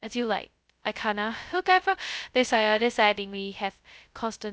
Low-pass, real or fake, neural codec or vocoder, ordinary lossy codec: none; fake; codec, 16 kHz, 0.2 kbps, FocalCodec; none